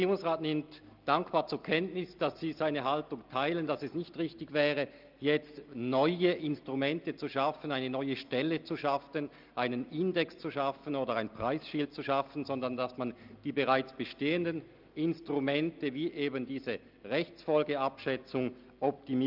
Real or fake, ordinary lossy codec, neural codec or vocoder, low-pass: real; Opus, 24 kbps; none; 5.4 kHz